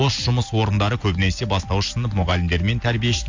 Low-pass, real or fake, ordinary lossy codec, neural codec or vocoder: 7.2 kHz; real; AAC, 48 kbps; none